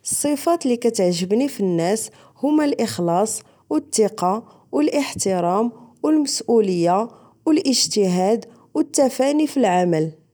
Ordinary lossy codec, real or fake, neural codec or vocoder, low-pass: none; real; none; none